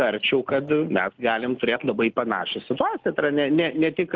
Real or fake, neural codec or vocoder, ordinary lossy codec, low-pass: real; none; Opus, 32 kbps; 7.2 kHz